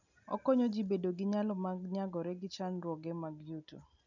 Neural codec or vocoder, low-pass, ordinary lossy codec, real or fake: none; 7.2 kHz; none; real